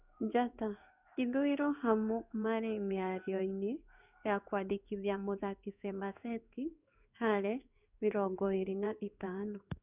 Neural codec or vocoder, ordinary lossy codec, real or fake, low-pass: codec, 16 kHz in and 24 kHz out, 1 kbps, XY-Tokenizer; none; fake; 3.6 kHz